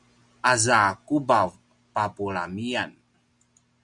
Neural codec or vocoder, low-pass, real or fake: none; 10.8 kHz; real